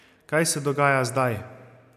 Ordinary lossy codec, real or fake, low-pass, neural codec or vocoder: none; real; 14.4 kHz; none